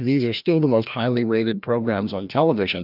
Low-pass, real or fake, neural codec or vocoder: 5.4 kHz; fake; codec, 16 kHz, 1 kbps, FreqCodec, larger model